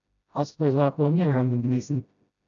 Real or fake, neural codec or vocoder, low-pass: fake; codec, 16 kHz, 0.5 kbps, FreqCodec, smaller model; 7.2 kHz